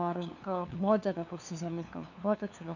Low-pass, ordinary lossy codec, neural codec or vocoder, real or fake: 7.2 kHz; none; codec, 16 kHz, 2 kbps, FunCodec, trained on LibriTTS, 25 frames a second; fake